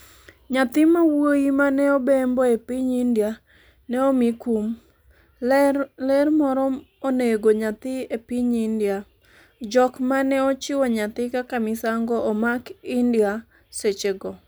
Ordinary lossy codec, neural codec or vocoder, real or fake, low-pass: none; none; real; none